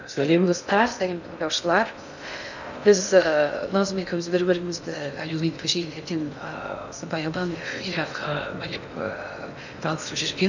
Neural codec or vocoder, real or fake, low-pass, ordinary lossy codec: codec, 16 kHz in and 24 kHz out, 0.6 kbps, FocalCodec, streaming, 2048 codes; fake; 7.2 kHz; none